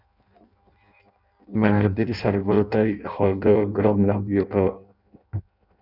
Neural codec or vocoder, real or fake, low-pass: codec, 16 kHz in and 24 kHz out, 0.6 kbps, FireRedTTS-2 codec; fake; 5.4 kHz